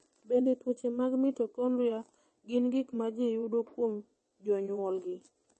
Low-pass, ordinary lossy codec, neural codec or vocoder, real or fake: 9.9 kHz; MP3, 32 kbps; vocoder, 22.05 kHz, 80 mel bands, WaveNeXt; fake